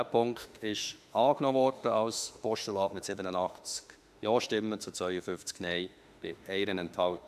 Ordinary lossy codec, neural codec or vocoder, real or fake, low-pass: AAC, 96 kbps; autoencoder, 48 kHz, 32 numbers a frame, DAC-VAE, trained on Japanese speech; fake; 14.4 kHz